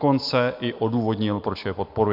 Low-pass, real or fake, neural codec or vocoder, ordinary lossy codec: 5.4 kHz; real; none; MP3, 48 kbps